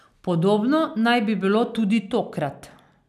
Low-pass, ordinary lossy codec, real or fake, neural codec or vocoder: 14.4 kHz; none; real; none